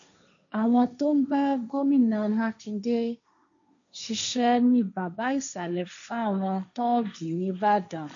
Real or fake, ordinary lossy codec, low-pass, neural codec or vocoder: fake; none; 7.2 kHz; codec, 16 kHz, 1.1 kbps, Voila-Tokenizer